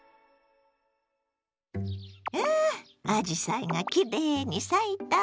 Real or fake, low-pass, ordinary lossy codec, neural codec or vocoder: real; none; none; none